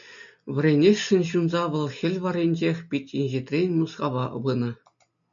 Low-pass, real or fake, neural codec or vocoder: 7.2 kHz; real; none